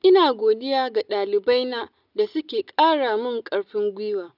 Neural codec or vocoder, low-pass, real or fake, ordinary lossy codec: none; 5.4 kHz; real; none